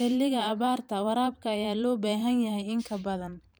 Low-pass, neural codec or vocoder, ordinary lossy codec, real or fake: none; vocoder, 44.1 kHz, 128 mel bands every 512 samples, BigVGAN v2; none; fake